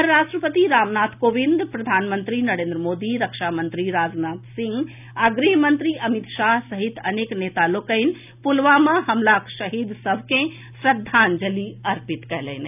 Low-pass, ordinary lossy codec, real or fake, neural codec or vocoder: 3.6 kHz; none; real; none